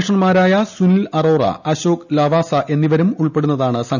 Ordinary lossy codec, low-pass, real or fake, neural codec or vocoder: none; 7.2 kHz; real; none